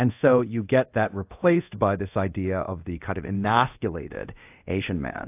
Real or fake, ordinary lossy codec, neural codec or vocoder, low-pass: fake; AAC, 32 kbps; codec, 24 kHz, 0.5 kbps, DualCodec; 3.6 kHz